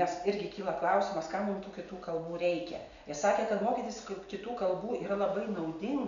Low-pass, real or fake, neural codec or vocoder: 7.2 kHz; real; none